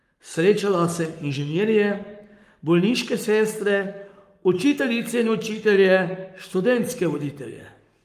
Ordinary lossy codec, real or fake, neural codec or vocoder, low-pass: Opus, 32 kbps; fake; codec, 44.1 kHz, 7.8 kbps, Pupu-Codec; 14.4 kHz